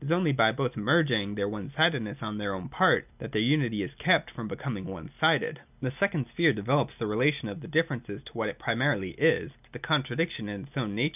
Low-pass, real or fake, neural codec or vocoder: 3.6 kHz; real; none